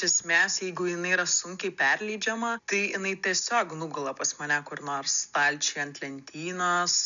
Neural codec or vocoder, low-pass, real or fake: none; 7.2 kHz; real